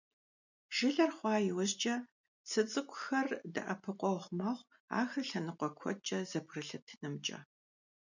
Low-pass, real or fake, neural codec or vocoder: 7.2 kHz; real; none